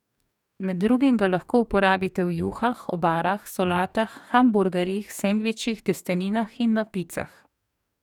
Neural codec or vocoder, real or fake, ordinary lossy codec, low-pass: codec, 44.1 kHz, 2.6 kbps, DAC; fake; none; 19.8 kHz